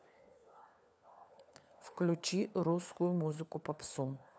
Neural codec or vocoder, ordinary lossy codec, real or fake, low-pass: codec, 16 kHz, 4 kbps, FunCodec, trained on LibriTTS, 50 frames a second; none; fake; none